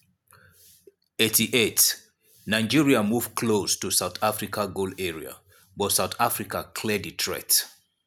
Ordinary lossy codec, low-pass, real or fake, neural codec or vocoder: none; none; real; none